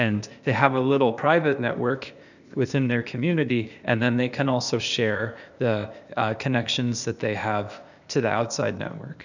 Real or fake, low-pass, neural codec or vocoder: fake; 7.2 kHz; codec, 16 kHz, 0.8 kbps, ZipCodec